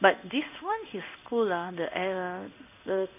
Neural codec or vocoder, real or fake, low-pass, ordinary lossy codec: codec, 16 kHz in and 24 kHz out, 1 kbps, XY-Tokenizer; fake; 3.6 kHz; none